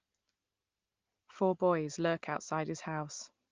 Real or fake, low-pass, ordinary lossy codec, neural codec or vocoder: real; 7.2 kHz; Opus, 24 kbps; none